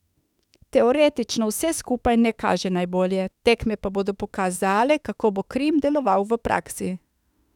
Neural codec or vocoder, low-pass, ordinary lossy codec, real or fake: autoencoder, 48 kHz, 32 numbers a frame, DAC-VAE, trained on Japanese speech; 19.8 kHz; none; fake